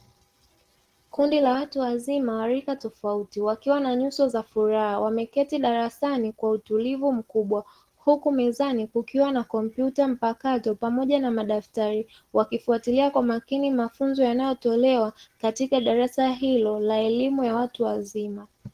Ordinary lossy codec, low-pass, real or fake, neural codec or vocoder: Opus, 16 kbps; 14.4 kHz; real; none